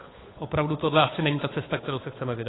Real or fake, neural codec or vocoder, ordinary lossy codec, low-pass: fake; codec, 16 kHz, 8 kbps, FunCodec, trained on Chinese and English, 25 frames a second; AAC, 16 kbps; 7.2 kHz